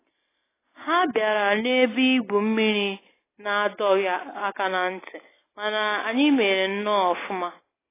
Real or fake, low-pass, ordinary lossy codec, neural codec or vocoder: real; 3.6 kHz; AAC, 16 kbps; none